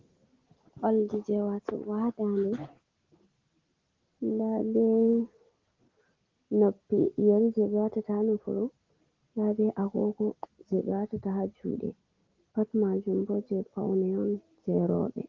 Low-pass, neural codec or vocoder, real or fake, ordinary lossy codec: 7.2 kHz; none; real; Opus, 16 kbps